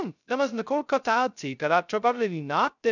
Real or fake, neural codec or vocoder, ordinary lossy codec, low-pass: fake; codec, 16 kHz, 0.2 kbps, FocalCodec; none; 7.2 kHz